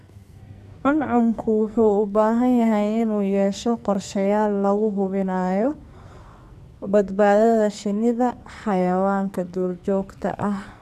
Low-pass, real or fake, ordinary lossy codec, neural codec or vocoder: 14.4 kHz; fake; none; codec, 44.1 kHz, 2.6 kbps, SNAC